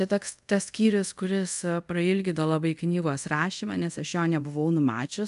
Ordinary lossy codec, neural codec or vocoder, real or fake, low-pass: AAC, 96 kbps; codec, 24 kHz, 0.5 kbps, DualCodec; fake; 10.8 kHz